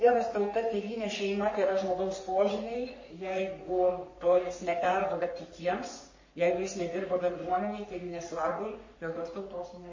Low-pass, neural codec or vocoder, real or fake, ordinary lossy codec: 7.2 kHz; codec, 44.1 kHz, 3.4 kbps, Pupu-Codec; fake; MP3, 32 kbps